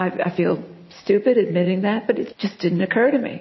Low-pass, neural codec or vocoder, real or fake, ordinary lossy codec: 7.2 kHz; none; real; MP3, 24 kbps